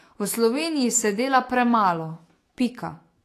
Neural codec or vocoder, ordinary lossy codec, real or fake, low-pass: autoencoder, 48 kHz, 128 numbers a frame, DAC-VAE, trained on Japanese speech; AAC, 48 kbps; fake; 14.4 kHz